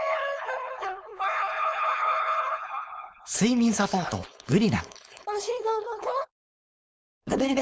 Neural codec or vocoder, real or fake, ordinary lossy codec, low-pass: codec, 16 kHz, 4.8 kbps, FACodec; fake; none; none